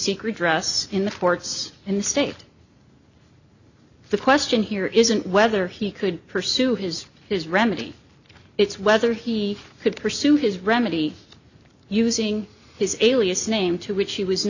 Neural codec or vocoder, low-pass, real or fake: none; 7.2 kHz; real